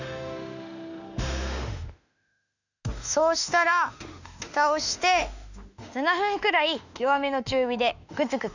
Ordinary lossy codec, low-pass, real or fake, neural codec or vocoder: none; 7.2 kHz; fake; autoencoder, 48 kHz, 32 numbers a frame, DAC-VAE, trained on Japanese speech